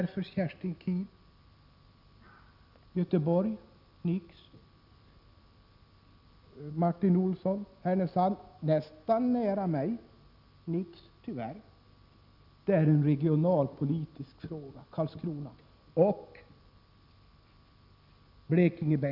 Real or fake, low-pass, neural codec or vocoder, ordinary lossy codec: real; 5.4 kHz; none; none